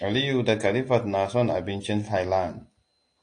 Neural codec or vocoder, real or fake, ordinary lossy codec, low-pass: none; real; AAC, 48 kbps; 9.9 kHz